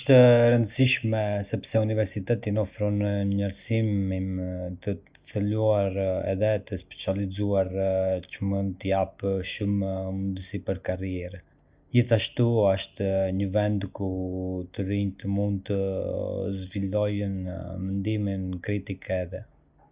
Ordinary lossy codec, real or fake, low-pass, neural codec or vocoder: Opus, 64 kbps; real; 3.6 kHz; none